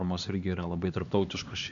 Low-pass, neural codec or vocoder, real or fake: 7.2 kHz; codec, 16 kHz, 2 kbps, X-Codec, HuBERT features, trained on LibriSpeech; fake